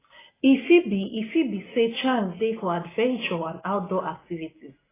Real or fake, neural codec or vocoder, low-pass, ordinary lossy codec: real; none; 3.6 kHz; AAC, 16 kbps